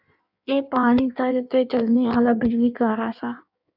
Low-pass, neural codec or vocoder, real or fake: 5.4 kHz; codec, 16 kHz in and 24 kHz out, 1.1 kbps, FireRedTTS-2 codec; fake